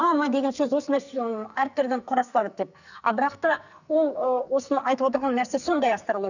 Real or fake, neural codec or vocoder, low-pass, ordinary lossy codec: fake; codec, 44.1 kHz, 2.6 kbps, SNAC; 7.2 kHz; none